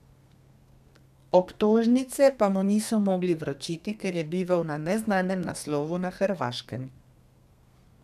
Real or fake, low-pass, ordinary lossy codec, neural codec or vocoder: fake; 14.4 kHz; none; codec, 32 kHz, 1.9 kbps, SNAC